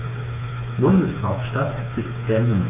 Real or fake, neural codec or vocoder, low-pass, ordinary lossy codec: fake; codec, 16 kHz, 4 kbps, FreqCodec, smaller model; 3.6 kHz; none